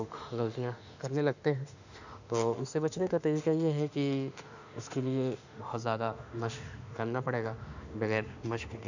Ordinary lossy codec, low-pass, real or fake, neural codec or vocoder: none; 7.2 kHz; fake; autoencoder, 48 kHz, 32 numbers a frame, DAC-VAE, trained on Japanese speech